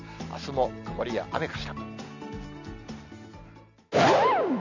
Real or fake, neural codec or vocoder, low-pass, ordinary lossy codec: real; none; 7.2 kHz; none